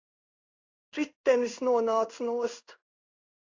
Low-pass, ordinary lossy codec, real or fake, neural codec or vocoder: 7.2 kHz; AAC, 48 kbps; fake; codec, 16 kHz in and 24 kHz out, 1 kbps, XY-Tokenizer